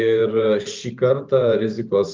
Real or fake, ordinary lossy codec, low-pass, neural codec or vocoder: fake; Opus, 32 kbps; 7.2 kHz; vocoder, 44.1 kHz, 128 mel bands every 512 samples, BigVGAN v2